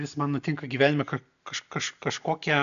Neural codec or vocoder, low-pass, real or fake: none; 7.2 kHz; real